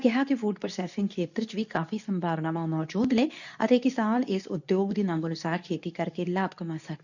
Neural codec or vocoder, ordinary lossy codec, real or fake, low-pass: codec, 24 kHz, 0.9 kbps, WavTokenizer, medium speech release version 2; none; fake; 7.2 kHz